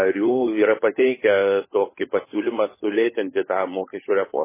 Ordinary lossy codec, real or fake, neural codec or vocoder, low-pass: MP3, 16 kbps; fake; codec, 16 kHz, 16 kbps, FunCodec, trained on LibriTTS, 50 frames a second; 3.6 kHz